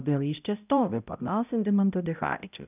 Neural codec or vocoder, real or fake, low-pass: codec, 16 kHz, 0.5 kbps, X-Codec, HuBERT features, trained on balanced general audio; fake; 3.6 kHz